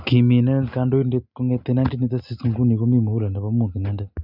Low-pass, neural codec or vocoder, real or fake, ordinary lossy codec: 5.4 kHz; none; real; none